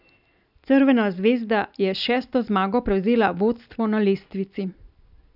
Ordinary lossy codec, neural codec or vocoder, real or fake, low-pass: none; none; real; 5.4 kHz